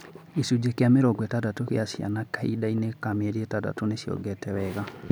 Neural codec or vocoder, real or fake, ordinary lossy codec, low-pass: none; real; none; none